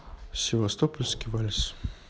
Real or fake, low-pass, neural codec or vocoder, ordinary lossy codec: real; none; none; none